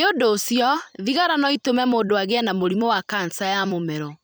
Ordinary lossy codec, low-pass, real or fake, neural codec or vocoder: none; none; fake; vocoder, 44.1 kHz, 128 mel bands every 256 samples, BigVGAN v2